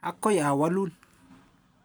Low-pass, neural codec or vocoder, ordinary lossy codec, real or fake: none; none; none; real